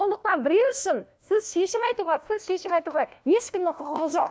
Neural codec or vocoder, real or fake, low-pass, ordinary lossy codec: codec, 16 kHz, 1 kbps, FunCodec, trained on LibriTTS, 50 frames a second; fake; none; none